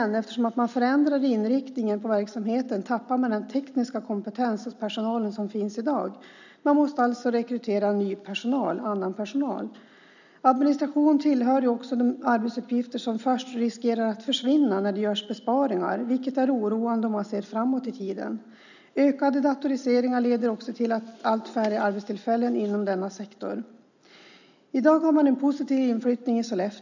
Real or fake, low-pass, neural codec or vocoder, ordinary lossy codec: real; 7.2 kHz; none; none